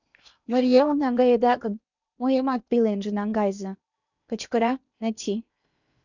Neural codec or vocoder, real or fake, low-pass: codec, 16 kHz in and 24 kHz out, 0.8 kbps, FocalCodec, streaming, 65536 codes; fake; 7.2 kHz